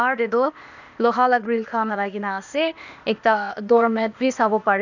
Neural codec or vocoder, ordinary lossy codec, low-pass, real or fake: codec, 16 kHz, 0.8 kbps, ZipCodec; none; 7.2 kHz; fake